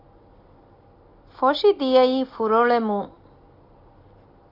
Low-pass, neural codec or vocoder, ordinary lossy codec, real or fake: 5.4 kHz; none; AAC, 32 kbps; real